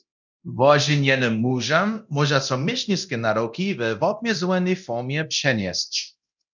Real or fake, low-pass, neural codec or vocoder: fake; 7.2 kHz; codec, 24 kHz, 0.9 kbps, DualCodec